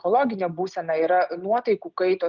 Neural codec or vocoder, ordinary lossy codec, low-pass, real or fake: none; Opus, 24 kbps; 7.2 kHz; real